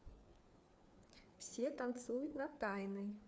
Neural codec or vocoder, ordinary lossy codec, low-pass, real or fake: codec, 16 kHz, 4 kbps, FreqCodec, larger model; none; none; fake